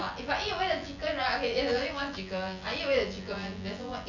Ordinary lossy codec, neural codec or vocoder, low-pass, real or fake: none; vocoder, 24 kHz, 100 mel bands, Vocos; 7.2 kHz; fake